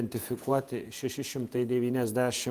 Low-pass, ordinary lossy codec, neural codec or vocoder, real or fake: 14.4 kHz; Opus, 32 kbps; vocoder, 48 kHz, 128 mel bands, Vocos; fake